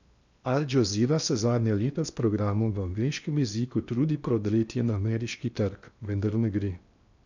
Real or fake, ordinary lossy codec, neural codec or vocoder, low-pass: fake; none; codec, 16 kHz in and 24 kHz out, 0.8 kbps, FocalCodec, streaming, 65536 codes; 7.2 kHz